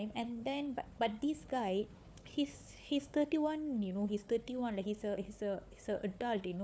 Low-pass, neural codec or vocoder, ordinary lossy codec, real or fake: none; codec, 16 kHz, 4 kbps, FunCodec, trained on Chinese and English, 50 frames a second; none; fake